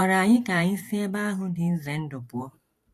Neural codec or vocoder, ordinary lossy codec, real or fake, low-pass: vocoder, 44.1 kHz, 128 mel bands every 512 samples, BigVGAN v2; AAC, 64 kbps; fake; 14.4 kHz